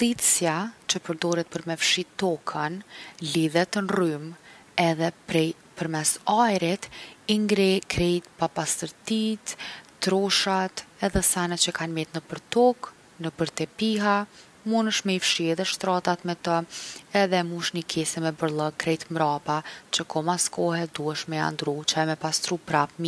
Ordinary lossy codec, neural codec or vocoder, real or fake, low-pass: none; none; real; none